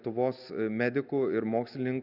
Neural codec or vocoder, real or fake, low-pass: none; real; 5.4 kHz